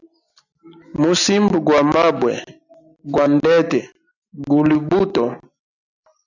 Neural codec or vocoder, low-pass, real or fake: none; 7.2 kHz; real